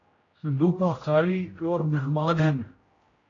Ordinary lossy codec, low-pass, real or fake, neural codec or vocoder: AAC, 32 kbps; 7.2 kHz; fake; codec, 16 kHz, 0.5 kbps, X-Codec, HuBERT features, trained on general audio